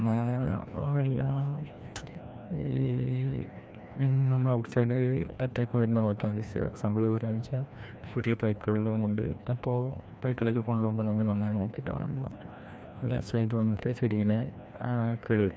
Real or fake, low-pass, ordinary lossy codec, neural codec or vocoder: fake; none; none; codec, 16 kHz, 1 kbps, FreqCodec, larger model